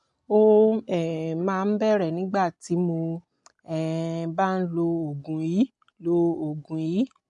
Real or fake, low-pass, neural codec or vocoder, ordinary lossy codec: real; 10.8 kHz; none; MP3, 64 kbps